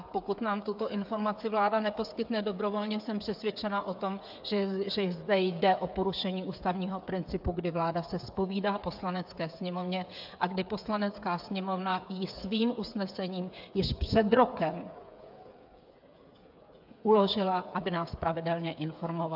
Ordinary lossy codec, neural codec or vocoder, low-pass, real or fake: AAC, 48 kbps; codec, 16 kHz, 8 kbps, FreqCodec, smaller model; 5.4 kHz; fake